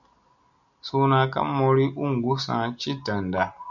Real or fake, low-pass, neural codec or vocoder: real; 7.2 kHz; none